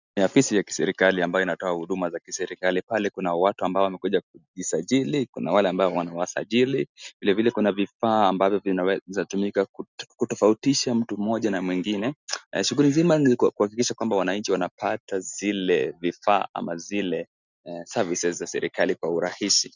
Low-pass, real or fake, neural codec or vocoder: 7.2 kHz; real; none